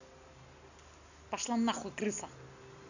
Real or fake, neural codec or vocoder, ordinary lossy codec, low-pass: real; none; none; 7.2 kHz